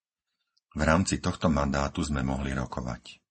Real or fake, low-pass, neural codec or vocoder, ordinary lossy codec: real; 10.8 kHz; none; MP3, 32 kbps